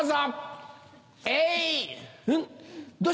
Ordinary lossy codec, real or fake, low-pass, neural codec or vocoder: none; real; none; none